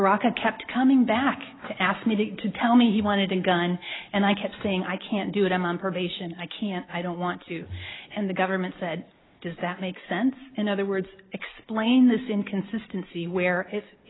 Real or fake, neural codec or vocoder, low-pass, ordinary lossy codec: real; none; 7.2 kHz; AAC, 16 kbps